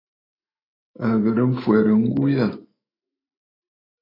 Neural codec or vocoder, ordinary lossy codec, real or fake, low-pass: autoencoder, 48 kHz, 128 numbers a frame, DAC-VAE, trained on Japanese speech; AAC, 24 kbps; fake; 5.4 kHz